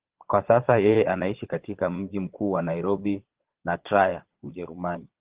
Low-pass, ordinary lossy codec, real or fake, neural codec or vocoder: 3.6 kHz; Opus, 16 kbps; fake; vocoder, 22.05 kHz, 80 mel bands, Vocos